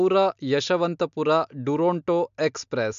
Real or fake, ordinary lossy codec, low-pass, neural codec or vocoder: real; MP3, 64 kbps; 7.2 kHz; none